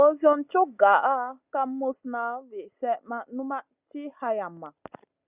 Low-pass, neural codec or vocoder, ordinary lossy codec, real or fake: 3.6 kHz; none; Opus, 64 kbps; real